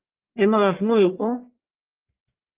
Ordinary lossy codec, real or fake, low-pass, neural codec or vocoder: Opus, 32 kbps; fake; 3.6 kHz; codec, 44.1 kHz, 1.7 kbps, Pupu-Codec